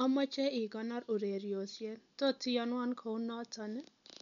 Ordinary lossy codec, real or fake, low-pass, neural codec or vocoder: none; real; 7.2 kHz; none